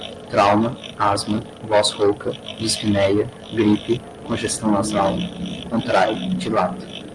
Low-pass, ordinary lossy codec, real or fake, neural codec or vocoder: 10.8 kHz; Opus, 32 kbps; real; none